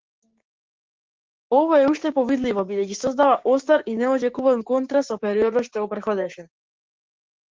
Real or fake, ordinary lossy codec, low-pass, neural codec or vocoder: fake; Opus, 16 kbps; 7.2 kHz; codec, 16 kHz, 6 kbps, DAC